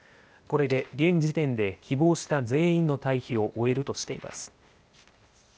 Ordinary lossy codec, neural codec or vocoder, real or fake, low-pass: none; codec, 16 kHz, 0.8 kbps, ZipCodec; fake; none